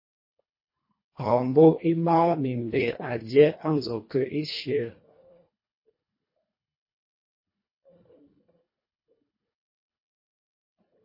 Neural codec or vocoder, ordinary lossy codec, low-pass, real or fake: codec, 24 kHz, 1.5 kbps, HILCodec; MP3, 24 kbps; 5.4 kHz; fake